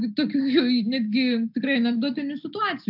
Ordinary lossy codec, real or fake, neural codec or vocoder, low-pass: AAC, 48 kbps; real; none; 5.4 kHz